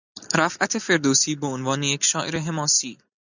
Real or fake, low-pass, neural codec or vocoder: real; 7.2 kHz; none